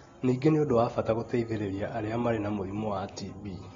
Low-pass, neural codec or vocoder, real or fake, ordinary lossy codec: 7.2 kHz; none; real; AAC, 24 kbps